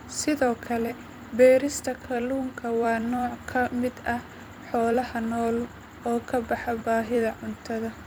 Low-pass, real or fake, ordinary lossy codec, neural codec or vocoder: none; fake; none; vocoder, 44.1 kHz, 128 mel bands every 256 samples, BigVGAN v2